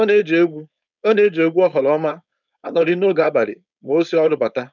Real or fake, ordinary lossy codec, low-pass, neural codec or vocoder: fake; none; 7.2 kHz; codec, 16 kHz, 4.8 kbps, FACodec